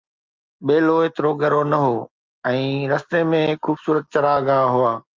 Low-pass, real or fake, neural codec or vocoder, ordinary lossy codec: 7.2 kHz; real; none; Opus, 24 kbps